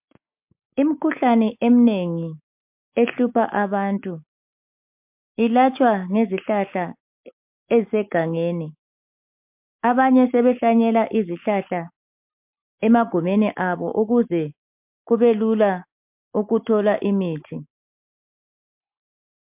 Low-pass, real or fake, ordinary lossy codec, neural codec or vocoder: 3.6 kHz; real; MP3, 32 kbps; none